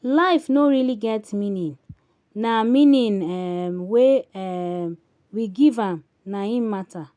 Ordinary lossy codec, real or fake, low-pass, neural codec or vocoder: none; real; 9.9 kHz; none